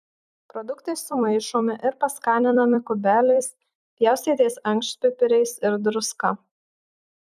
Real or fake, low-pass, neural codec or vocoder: fake; 14.4 kHz; vocoder, 44.1 kHz, 128 mel bands every 256 samples, BigVGAN v2